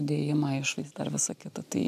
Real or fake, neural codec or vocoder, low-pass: real; none; 14.4 kHz